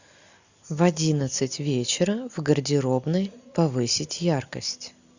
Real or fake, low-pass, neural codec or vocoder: real; 7.2 kHz; none